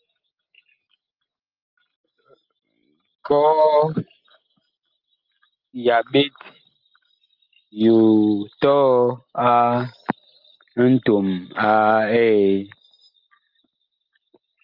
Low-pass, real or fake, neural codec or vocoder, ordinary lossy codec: 5.4 kHz; real; none; Opus, 24 kbps